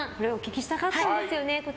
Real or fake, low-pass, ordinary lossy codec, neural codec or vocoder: real; none; none; none